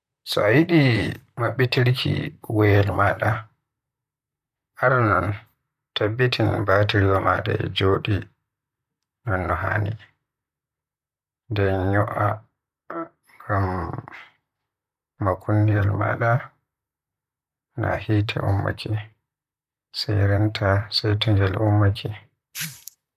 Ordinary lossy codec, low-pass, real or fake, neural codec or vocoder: none; 14.4 kHz; fake; vocoder, 44.1 kHz, 128 mel bands, Pupu-Vocoder